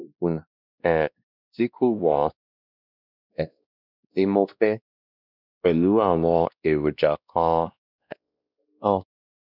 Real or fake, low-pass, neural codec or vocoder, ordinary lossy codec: fake; 5.4 kHz; codec, 16 kHz, 1 kbps, X-Codec, WavLM features, trained on Multilingual LibriSpeech; none